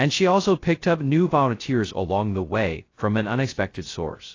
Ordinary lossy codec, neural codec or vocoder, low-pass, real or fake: AAC, 32 kbps; codec, 16 kHz, 0.2 kbps, FocalCodec; 7.2 kHz; fake